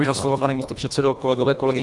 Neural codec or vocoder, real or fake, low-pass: codec, 24 kHz, 1.5 kbps, HILCodec; fake; 10.8 kHz